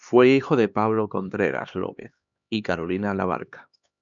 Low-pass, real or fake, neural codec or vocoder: 7.2 kHz; fake; codec, 16 kHz, 2 kbps, X-Codec, HuBERT features, trained on LibriSpeech